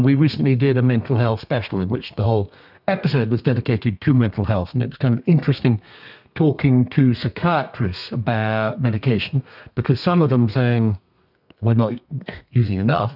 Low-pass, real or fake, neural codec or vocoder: 5.4 kHz; fake; codec, 32 kHz, 1.9 kbps, SNAC